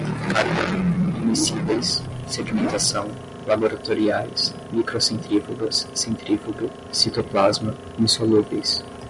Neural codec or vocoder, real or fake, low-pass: none; real; 10.8 kHz